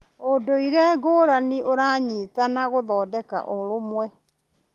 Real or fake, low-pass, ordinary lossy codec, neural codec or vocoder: real; 14.4 kHz; Opus, 32 kbps; none